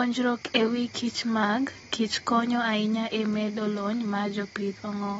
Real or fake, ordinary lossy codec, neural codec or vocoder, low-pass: real; AAC, 24 kbps; none; 9.9 kHz